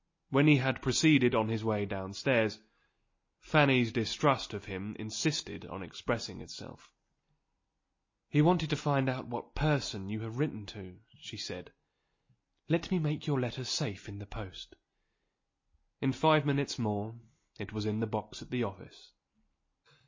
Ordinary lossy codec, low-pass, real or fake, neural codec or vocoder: MP3, 32 kbps; 7.2 kHz; real; none